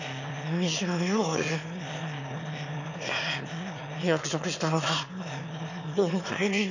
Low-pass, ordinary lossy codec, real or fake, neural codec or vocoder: 7.2 kHz; none; fake; autoencoder, 22.05 kHz, a latent of 192 numbers a frame, VITS, trained on one speaker